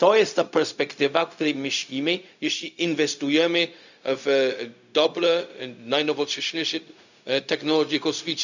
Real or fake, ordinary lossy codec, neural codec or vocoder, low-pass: fake; none; codec, 16 kHz, 0.4 kbps, LongCat-Audio-Codec; 7.2 kHz